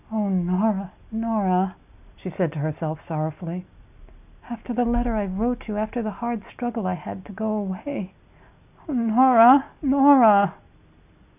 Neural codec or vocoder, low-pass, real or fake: none; 3.6 kHz; real